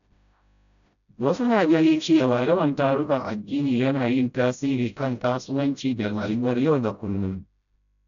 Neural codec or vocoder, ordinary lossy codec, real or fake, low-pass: codec, 16 kHz, 0.5 kbps, FreqCodec, smaller model; none; fake; 7.2 kHz